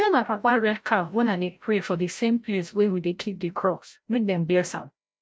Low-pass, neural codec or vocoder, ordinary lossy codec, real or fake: none; codec, 16 kHz, 0.5 kbps, FreqCodec, larger model; none; fake